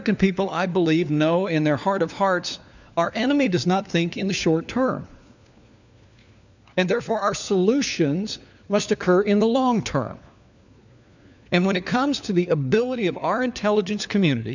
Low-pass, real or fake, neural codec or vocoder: 7.2 kHz; fake; codec, 16 kHz, 4 kbps, FunCodec, trained on LibriTTS, 50 frames a second